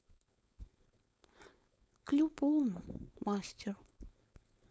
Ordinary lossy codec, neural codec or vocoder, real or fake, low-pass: none; codec, 16 kHz, 4.8 kbps, FACodec; fake; none